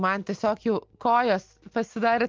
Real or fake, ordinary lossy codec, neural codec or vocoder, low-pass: real; Opus, 24 kbps; none; 7.2 kHz